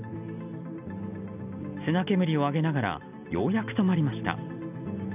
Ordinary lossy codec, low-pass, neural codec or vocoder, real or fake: none; 3.6 kHz; none; real